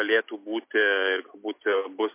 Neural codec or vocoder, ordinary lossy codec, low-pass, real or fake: none; MP3, 24 kbps; 3.6 kHz; real